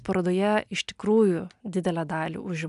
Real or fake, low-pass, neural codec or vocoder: real; 10.8 kHz; none